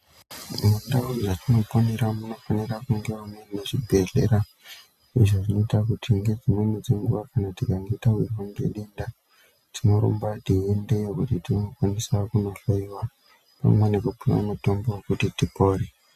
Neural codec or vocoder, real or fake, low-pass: none; real; 14.4 kHz